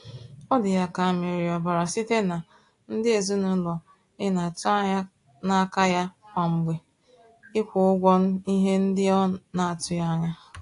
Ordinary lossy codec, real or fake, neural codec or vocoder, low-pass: AAC, 48 kbps; real; none; 10.8 kHz